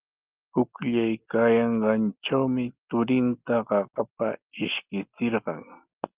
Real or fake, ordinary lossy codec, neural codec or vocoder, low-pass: real; Opus, 32 kbps; none; 3.6 kHz